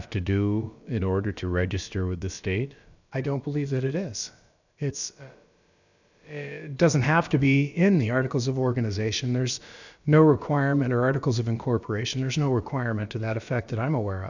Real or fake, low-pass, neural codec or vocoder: fake; 7.2 kHz; codec, 16 kHz, about 1 kbps, DyCAST, with the encoder's durations